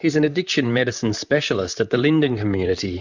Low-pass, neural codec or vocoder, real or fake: 7.2 kHz; vocoder, 44.1 kHz, 128 mel bands, Pupu-Vocoder; fake